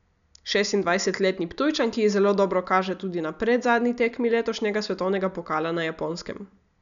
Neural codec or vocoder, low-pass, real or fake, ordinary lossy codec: none; 7.2 kHz; real; none